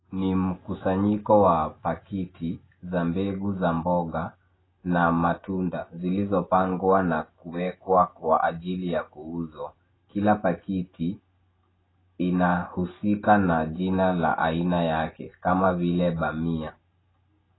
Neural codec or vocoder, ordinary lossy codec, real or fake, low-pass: none; AAC, 16 kbps; real; 7.2 kHz